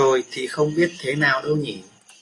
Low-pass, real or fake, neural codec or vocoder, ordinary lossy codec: 10.8 kHz; real; none; AAC, 32 kbps